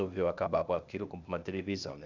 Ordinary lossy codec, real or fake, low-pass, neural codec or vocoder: none; fake; 7.2 kHz; codec, 16 kHz, 0.8 kbps, ZipCodec